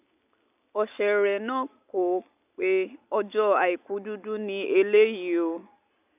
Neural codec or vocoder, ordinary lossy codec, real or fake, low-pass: none; none; real; 3.6 kHz